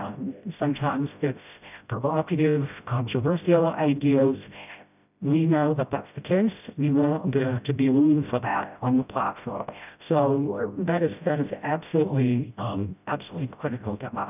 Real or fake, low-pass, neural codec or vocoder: fake; 3.6 kHz; codec, 16 kHz, 0.5 kbps, FreqCodec, smaller model